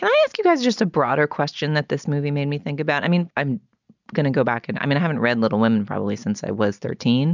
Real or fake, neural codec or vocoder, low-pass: real; none; 7.2 kHz